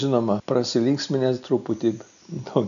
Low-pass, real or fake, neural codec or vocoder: 7.2 kHz; real; none